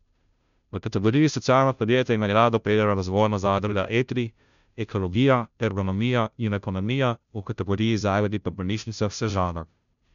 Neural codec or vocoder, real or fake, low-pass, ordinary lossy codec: codec, 16 kHz, 0.5 kbps, FunCodec, trained on Chinese and English, 25 frames a second; fake; 7.2 kHz; none